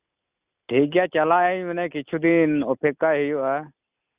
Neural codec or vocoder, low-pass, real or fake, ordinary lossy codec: none; 3.6 kHz; real; Opus, 64 kbps